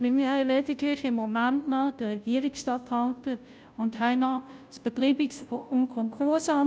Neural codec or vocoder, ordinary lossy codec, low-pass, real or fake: codec, 16 kHz, 0.5 kbps, FunCodec, trained on Chinese and English, 25 frames a second; none; none; fake